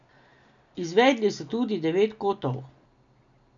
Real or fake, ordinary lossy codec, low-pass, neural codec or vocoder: real; none; 7.2 kHz; none